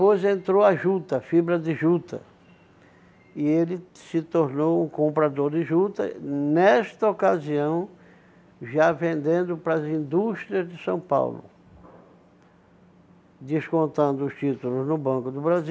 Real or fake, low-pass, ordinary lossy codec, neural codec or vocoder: real; none; none; none